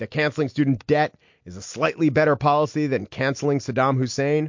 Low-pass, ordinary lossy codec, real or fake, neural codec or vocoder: 7.2 kHz; MP3, 48 kbps; real; none